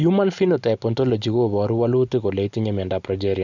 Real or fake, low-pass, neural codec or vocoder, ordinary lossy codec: real; 7.2 kHz; none; none